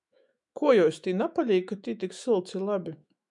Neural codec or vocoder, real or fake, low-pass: autoencoder, 48 kHz, 128 numbers a frame, DAC-VAE, trained on Japanese speech; fake; 10.8 kHz